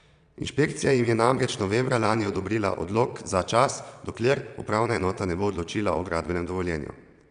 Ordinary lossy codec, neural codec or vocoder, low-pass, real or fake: none; vocoder, 22.05 kHz, 80 mel bands, Vocos; 9.9 kHz; fake